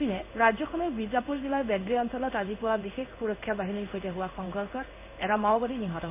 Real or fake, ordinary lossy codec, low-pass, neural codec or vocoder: fake; none; 3.6 kHz; codec, 16 kHz in and 24 kHz out, 1 kbps, XY-Tokenizer